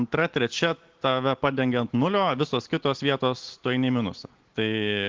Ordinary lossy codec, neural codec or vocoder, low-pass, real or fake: Opus, 16 kbps; none; 7.2 kHz; real